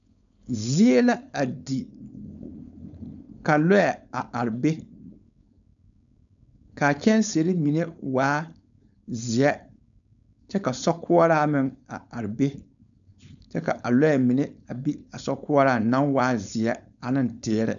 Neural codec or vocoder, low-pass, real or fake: codec, 16 kHz, 4.8 kbps, FACodec; 7.2 kHz; fake